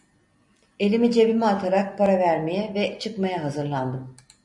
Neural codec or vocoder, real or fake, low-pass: none; real; 10.8 kHz